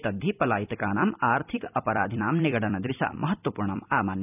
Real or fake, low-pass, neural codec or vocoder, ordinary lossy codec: fake; 3.6 kHz; vocoder, 44.1 kHz, 128 mel bands every 256 samples, BigVGAN v2; none